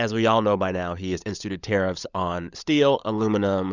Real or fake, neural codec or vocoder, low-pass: fake; codec, 16 kHz, 16 kbps, FunCodec, trained on LibriTTS, 50 frames a second; 7.2 kHz